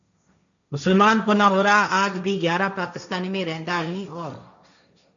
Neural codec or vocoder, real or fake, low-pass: codec, 16 kHz, 1.1 kbps, Voila-Tokenizer; fake; 7.2 kHz